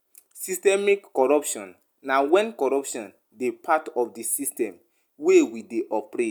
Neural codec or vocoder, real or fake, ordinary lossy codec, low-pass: none; real; none; none